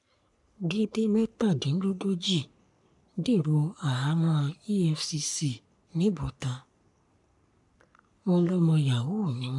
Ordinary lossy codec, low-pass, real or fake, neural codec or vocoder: none; 10.8 kHz; fake; codec, 44.1 kHz, 3.4 kbps, Pupu-Codec